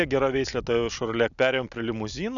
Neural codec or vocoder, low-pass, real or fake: none; 7.2 kHz; real